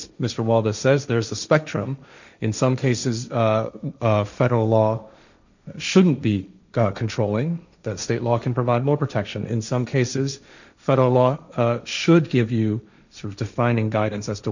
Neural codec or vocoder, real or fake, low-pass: codec, 16 kHz, 1.1 kbps, Voila-Tokenizer; fake; 7.2 kHz